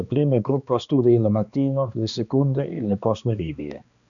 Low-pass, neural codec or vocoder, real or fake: 7.2 kHz; codec, 16 kHz, 2 kbps, X-Codec, HuBERT features, trained on balanced general audio; fake